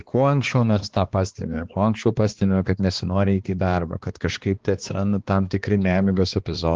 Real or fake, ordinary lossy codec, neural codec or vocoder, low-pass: fake; Opus, 16 kbps; codec, 16 kHz, 2 kbps, X-Codec, HuBERT features, trained on balanced general audio; 7.2 kHz